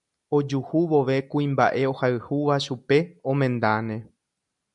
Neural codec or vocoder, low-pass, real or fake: none; 10.8 kHz; real